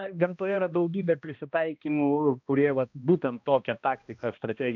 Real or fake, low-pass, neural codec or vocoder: fake; 7.2 kHz; codec, 16 kHz, 1 kbps, X-Codec, HuBERT features, trained on balanced general audio